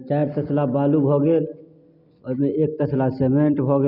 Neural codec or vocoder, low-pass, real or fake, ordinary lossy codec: none; 5.4 kHz; real; none